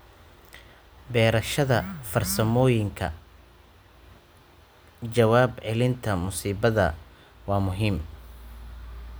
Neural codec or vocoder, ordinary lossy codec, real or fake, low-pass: none; none; real; none